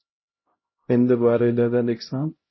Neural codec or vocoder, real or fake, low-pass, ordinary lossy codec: codec, 16 kHz, 0.5 kbps, X-Codec, HuBERT features, trained on LibriSpeech; fake; 7.2 kHz; MP3, 24 kbps